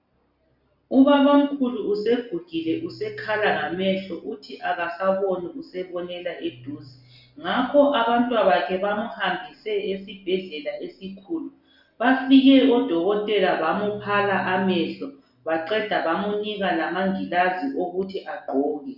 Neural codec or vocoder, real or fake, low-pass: none; real; 5.4 kHz